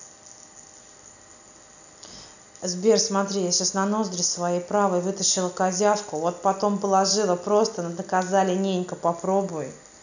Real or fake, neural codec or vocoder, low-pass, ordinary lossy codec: real; none; 7.2 kHz; none